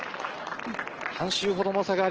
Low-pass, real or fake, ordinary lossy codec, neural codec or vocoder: 7.2 kHz; real; Opus, 16 kbps; none